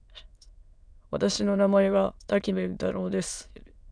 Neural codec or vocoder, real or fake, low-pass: autoencoder, 22.05 kHz, a latent of 192 numbers a frame, VITS, trained on many speakers; fake; 9.9 kHz